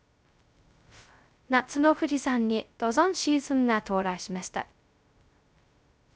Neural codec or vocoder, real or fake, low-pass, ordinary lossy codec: codec, 16 kHz, 0.2 kbps, FocalCodec; fake; none; none